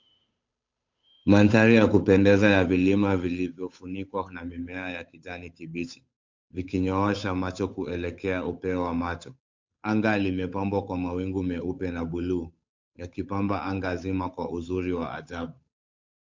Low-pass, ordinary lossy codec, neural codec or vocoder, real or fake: 7.2 kHz; MP3, 64 kbps; codec, 16 kHz, 8 kbps, FunCodec, trained on Chinese and English, 25 frames a second; fake